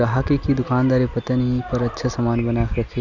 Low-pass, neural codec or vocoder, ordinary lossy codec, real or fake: 7.2 kHz; none; none; real